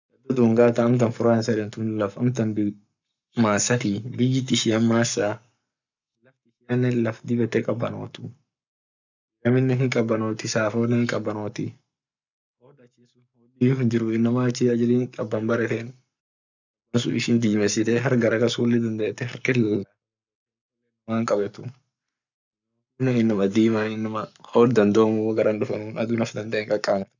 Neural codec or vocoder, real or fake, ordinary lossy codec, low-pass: codec, 44.1 kHz, 7.8 kbps, Pupu-Codec; fake; none; 7.2 kHz